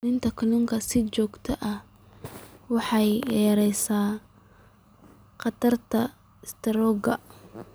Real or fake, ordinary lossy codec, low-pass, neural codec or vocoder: real; none; none; none